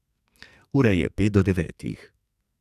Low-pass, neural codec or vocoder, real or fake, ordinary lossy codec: 14.4 kHz; codec, 44.1 kHz, 2.6 kbps, SNAC; fake; none